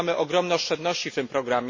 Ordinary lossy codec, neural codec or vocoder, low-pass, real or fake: MP3, 32 kbps; none; 7.2 kHz; real